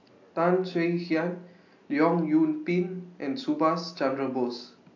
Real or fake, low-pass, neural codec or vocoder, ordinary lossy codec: real; 7.2 kHz; none; AAC, 48 kbps